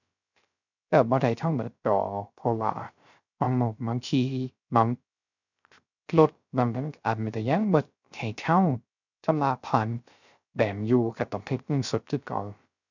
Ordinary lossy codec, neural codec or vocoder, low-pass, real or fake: none; codec, 16 kHz, 0.3 kbps, FocalCodec; 7.2 kHz; fake